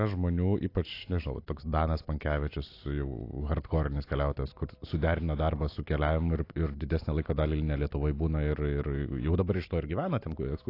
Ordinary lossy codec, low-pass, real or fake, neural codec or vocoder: AAC, 32 kbps; 5.4 kHz; real; none